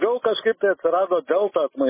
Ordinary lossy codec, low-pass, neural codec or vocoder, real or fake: MP3, 16 kbps; 3.6 kHz; none; real